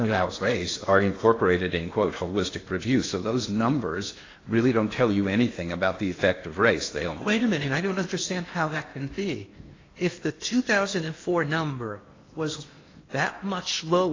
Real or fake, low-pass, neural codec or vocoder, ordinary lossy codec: fake; 7.2 kHz; codec, 16 kHz in and 24 kHz out, 0.8 kbps, FocalCodec, streaming, 65536 codes; AAC, 32 kbps